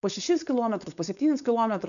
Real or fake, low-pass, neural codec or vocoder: fake; 7.2 kHz; codec, 16 kHz, 4.8 kbps, FACodec